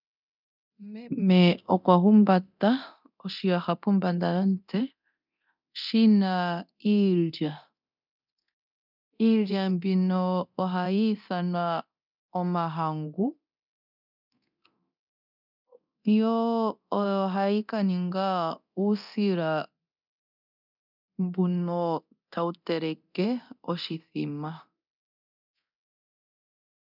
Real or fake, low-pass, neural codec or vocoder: fake; 5.4 kHz; codec, 24 kHz, 0.9 kbps, DualCodec